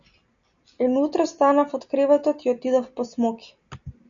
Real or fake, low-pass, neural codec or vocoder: real; 7.2 kHz; none